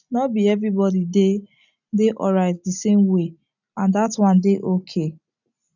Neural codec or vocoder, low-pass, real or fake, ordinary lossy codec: none; 7.2 kHz; real; none